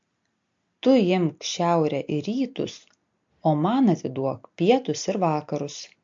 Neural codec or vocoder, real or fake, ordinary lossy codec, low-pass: none; real; MP3, 48 kbps; 7.2 kHz